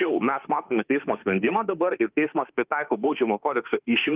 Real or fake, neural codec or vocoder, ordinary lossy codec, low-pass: fake; autoencoder, 48 kHz, 32 numbers a frame, DAC-VAE, trained on Japanese speech; Opus, 32 kbps; 3.6 kHz